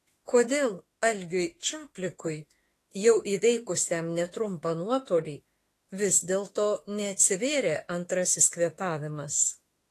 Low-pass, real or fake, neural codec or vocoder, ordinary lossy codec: 14.4 kHz; fake; autoencoder, 48 kHz, 32 numbers a frame, DAC-VAE, trained on Japanese speech; AAC, 48 kbps